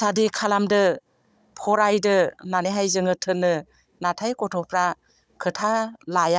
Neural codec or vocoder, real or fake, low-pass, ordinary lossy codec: codec, 16 kHz, 16 kbps, FunCodec, trained on Chinese and English, 50 frames a second; fake; none; none